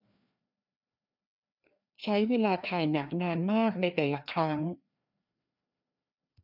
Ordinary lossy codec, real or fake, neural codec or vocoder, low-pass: none; fake; codec, 16 kHz, 2 kbps, FreqCodec, larger model; 5.4 kHz